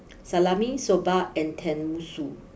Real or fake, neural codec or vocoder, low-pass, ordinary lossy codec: real; none; none; none